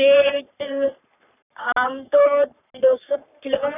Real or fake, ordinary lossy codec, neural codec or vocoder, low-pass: fake; none; codec, 44.1 kHz, 3.4 kbps, Pupu-Codec; 3.6 kHz